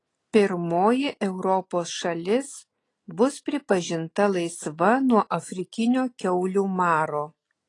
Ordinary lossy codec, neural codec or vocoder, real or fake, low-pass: AAC, 32 kbps; none; real; 10.8 kHz